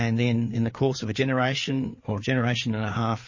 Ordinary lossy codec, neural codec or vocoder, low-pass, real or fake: MP3, 32 kbps; codec, 16 kHz, 4 kbps, FreqCodec, larger model; 7.2 kHz; fake